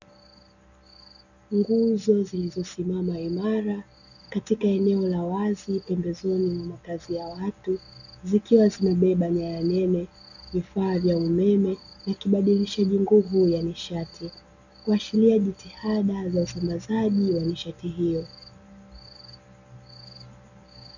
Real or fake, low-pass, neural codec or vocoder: real; 7.2 kHz; none